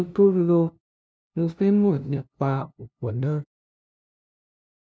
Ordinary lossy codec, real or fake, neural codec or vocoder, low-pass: none; fake; codec, 16 kHz, 0.5 kbps, FunCodec, trained on LibriTTS, 25 frames a second; none